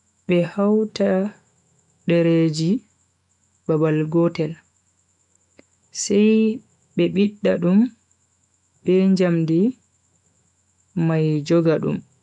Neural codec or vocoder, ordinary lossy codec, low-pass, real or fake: autoencoder, 48 kHz, 128 numbers a frame, DAC-VAE, trained on Japanese speech; none; 10.8 kHz; fake